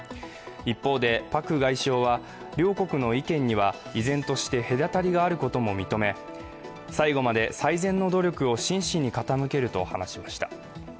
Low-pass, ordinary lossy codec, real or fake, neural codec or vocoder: none; none; real; none